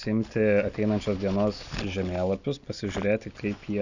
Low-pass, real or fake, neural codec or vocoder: 7.2 kHz; real; none